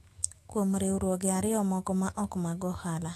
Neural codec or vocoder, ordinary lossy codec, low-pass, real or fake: autoencoder, 48 kHz, 128 numbers a frame, DAC-VAE, trained on Japanese speech; AAC, 48 kbps; 14.4 kHz; fake